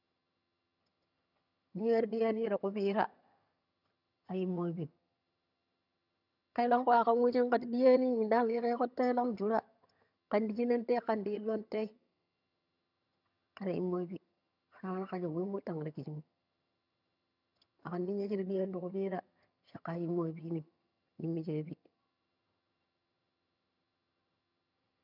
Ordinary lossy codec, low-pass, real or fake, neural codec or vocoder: none; 5.4 kHz; fake; vocoder, 22.05 kHz, 80 mel bands, HiFi-GAN